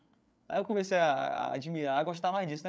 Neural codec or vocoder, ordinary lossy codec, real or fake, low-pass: codec, 16 kHz, 8 kbps, FreqCodec, larger model; none; fake; none